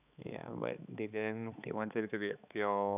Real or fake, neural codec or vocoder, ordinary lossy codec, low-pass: fake; codec, 16 kHz, 4 kbps, X-Codec, HuBERT features, trained on balanced general audio; none; 3.6 kHz